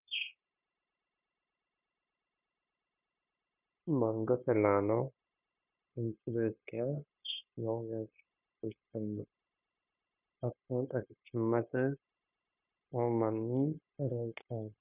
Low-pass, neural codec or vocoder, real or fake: 3.6 kHz; codec, 16 kHz, 0.9 kbps, LongCat-Audio-Codec; fake